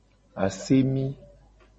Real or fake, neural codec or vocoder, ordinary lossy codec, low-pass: real; none; MP3, 32 kbps; 10.8 kHz